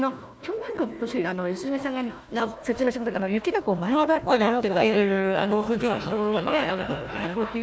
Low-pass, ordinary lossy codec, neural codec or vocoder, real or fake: none; none; codec, 16 kHz, 1 kbps, FunCodec, trained on Chinese and English, 50 frames a second; fake